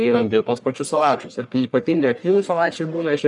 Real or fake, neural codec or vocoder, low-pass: fake; codec, 44.1 kHz, 1.7 kbps, Pupu-Codec; 10.8 kHz